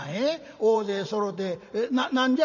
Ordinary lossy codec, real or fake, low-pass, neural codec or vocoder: none; fake; 7.2 kHz; vocoder, 44.1 kHz, 80 mel bands, Vocos